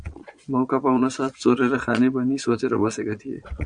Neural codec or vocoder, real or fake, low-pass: vocoder, 22.05 kHz, 80 mel bands, Vocos; fake; 9.9 kHz